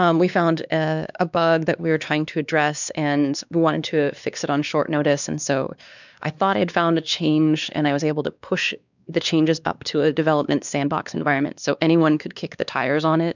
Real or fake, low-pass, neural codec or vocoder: fake; 7.2 kHz; codec, 16 kHz, 2 kbps, X-Codec, WavLM features, trained on Multilingual LibriSpeech